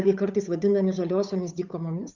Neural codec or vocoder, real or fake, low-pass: codec, 16 kHz, 8 kbps, FunCodec, trained on Chinese and English, 25 frames a second; fake; 7.2 kHz